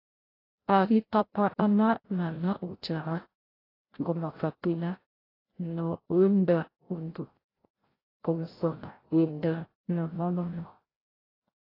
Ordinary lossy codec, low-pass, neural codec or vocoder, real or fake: AAC, 24 kbps; 5.4 kHz; codec, 16 kHz, 0.5 kbps, FreqCodec, larger model; fake